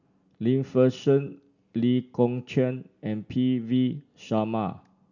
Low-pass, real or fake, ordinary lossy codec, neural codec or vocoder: 7.2 kHz; real; none; none